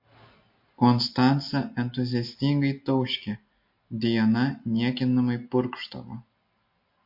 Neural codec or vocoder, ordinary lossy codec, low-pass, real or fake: none; MP3, 32 kbps; 5.4 kHz; real